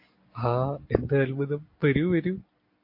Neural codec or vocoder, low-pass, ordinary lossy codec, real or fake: none; 5.4 kHz; MP3, 32 kbps; real